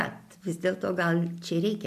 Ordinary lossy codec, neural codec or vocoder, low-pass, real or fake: MP3, 96 kbps; none; 14.4 kHz; real